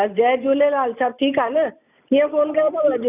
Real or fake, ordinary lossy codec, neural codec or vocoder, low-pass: real; none; none; 3.6 kHz